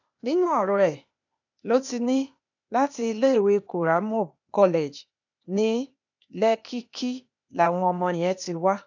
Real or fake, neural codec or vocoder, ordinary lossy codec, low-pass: fake; codec, 16 kHz, 0.8 kbps, ZipCodec; none; 7.2 kHz